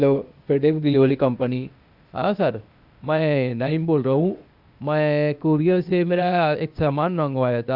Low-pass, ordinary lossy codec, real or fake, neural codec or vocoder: 5.4 kHz; Opus, 64 kbps; fake; codec, 16 kHz, 0.8 kbps, ZipCodec